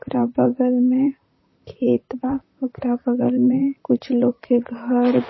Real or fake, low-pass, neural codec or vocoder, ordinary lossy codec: fake; 7.2 kHz; vocoder, 44.1 kHz, 128 mel bands, Pupu-Vocoder; MP3, 24 kbps